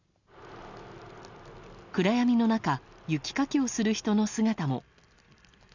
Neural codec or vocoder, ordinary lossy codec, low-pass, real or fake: none; none; 7.2 kHz; real